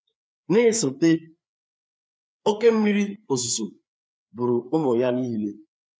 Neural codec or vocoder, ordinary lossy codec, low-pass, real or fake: codec, 16 kHz, 4 kbps, FreqCodec, larger model; none; none; fake